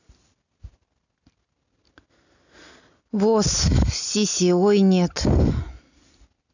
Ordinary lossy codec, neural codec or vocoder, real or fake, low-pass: none; none; real; 7.2 kHz